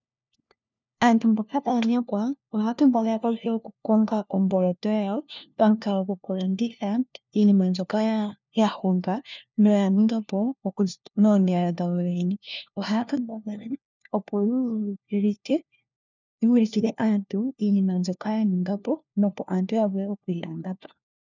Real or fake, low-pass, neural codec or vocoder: fake; 7.2 kHz; codec, 16 kHz, 1 kbps, FunCodec, trained on LibriTTS, 50 frames a second